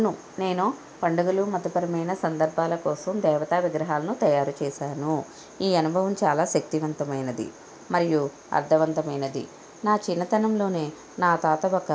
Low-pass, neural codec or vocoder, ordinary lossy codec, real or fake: none; none; none; real